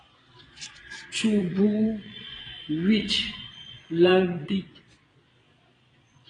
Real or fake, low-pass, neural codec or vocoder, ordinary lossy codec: fake; 9.9 kHz; vocoder, 22.05 kHz, 80 mel bands, Vocos; AAC, 32 kbps